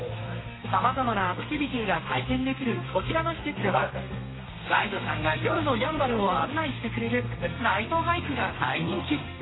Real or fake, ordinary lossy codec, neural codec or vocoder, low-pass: fake; AAC, 16 kbps; codec, 32 kHz, 1.9 kbps, SNAC; 7.2 kHz